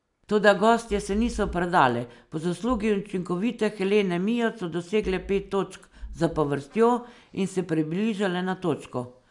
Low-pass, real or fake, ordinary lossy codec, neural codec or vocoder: 10.8 kHz; real; none; none